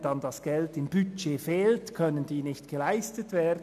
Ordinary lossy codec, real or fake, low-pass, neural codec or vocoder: none; real; 14.4 kHz; none